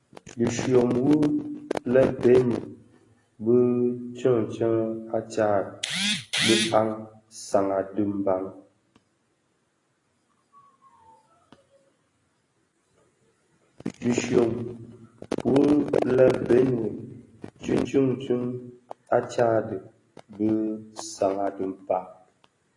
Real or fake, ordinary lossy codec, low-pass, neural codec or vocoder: real; AAC, 32 kbps; 10.8 kHz; none